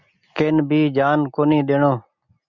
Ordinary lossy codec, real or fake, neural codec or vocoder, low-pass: Opus, 64 kbps; real; none; 7.2 kHz